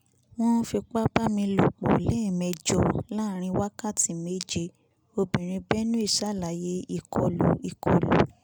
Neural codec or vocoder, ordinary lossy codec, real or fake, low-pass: none; none; real; none